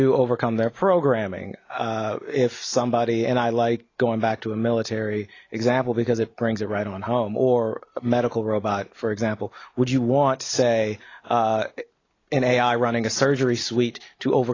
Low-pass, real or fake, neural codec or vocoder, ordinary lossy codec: 7.2 kHz; real; none; AAC, 32 kbps